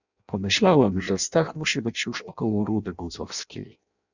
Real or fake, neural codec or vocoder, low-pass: fake; codec, 16 kHz in and 24 kHz out, 0.6 kbps, FireRedTTS-2 codec; 7.2 kHz